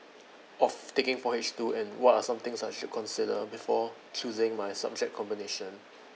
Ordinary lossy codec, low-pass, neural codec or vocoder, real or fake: none; none; none; real